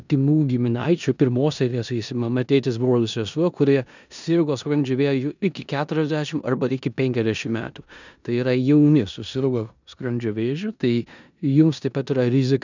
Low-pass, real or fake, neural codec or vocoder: 7.2 kHz; fake; codec, 16 kHz in and 24 kHz out, 0.9 kbps, LongCat-Audio-Codec, four codebook decoder